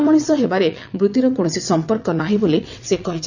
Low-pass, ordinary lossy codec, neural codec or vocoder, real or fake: 7.2 kHz; none; vocoder, 22.05 kHz, 80 mel bands, WaveNeXt; fake